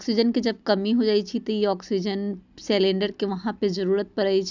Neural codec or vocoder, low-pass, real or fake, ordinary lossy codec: none; 7.2 kHz; real; none